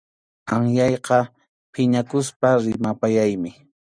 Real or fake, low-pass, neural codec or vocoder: real; 9.9 kHz; none